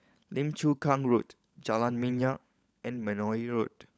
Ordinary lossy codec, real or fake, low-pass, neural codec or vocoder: none; fake; none; codec, 16 kHz, 16 kbps, FunCodec, trained on LibriTTS, 50 frames a second